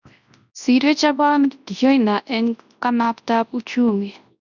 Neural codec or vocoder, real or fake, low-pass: codec, 24 kHz, 0.9 kbps, WavTokenizer, large speech release; fake; 7.2 kHz